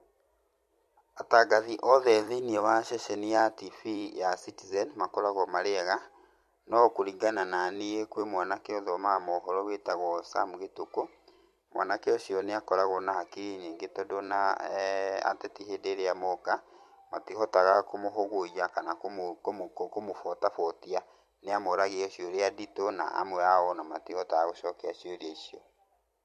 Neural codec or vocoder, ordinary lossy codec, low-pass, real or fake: vocoder, 44.1 kHz, 128 mel bands every 256 samples, BigVGAN v2; MP3, 64 kbps; 14.4 kHz; fake